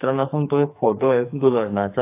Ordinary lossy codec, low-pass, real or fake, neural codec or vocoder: AAC, 24 kbps; 3.6 kHz; fake; codec, 44.1 kHz, 2.6 kbps, SNAC